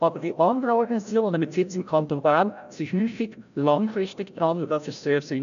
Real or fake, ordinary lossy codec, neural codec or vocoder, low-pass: fake; none; codec, 16 kHz, 0.5 kbps, FreqCodec, larger model; 7.2 kHz